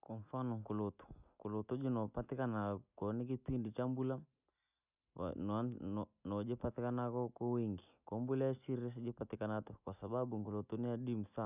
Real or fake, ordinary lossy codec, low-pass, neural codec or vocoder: real; none; 3.6 kHz; none